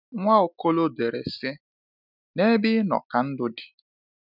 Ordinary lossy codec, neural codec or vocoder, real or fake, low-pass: none; none; real; 5.4 kHz